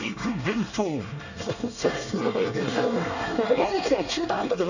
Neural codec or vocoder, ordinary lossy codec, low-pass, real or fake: codec, 24 kHz, 1 kbps, SNAC; none; 7.2 kHz; fake